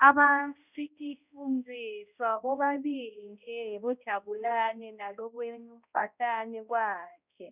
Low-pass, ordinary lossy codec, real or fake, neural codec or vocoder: 3.6 kHz; none; fake; codec, 16 kHz, 0.5 kbps, X-Codec, HuBERT features, trained on balanced general audio